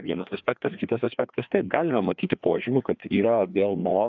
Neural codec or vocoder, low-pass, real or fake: codec, 16 kHz, 2 kbps, FreqCodec, larger model; 7.2 kHz; fake